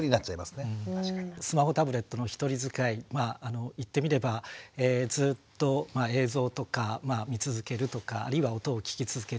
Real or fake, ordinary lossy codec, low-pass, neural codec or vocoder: real; none; none; none